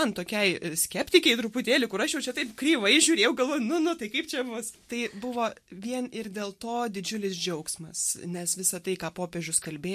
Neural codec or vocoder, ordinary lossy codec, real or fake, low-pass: none; MP3, 64 kbps; real; 14.4 kHz